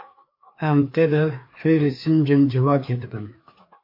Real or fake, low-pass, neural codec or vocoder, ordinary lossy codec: fake; 5.4 kHz; codec, 16 kHz, 2 kbps, FreqCodec, larger model; MP3, 32 kbps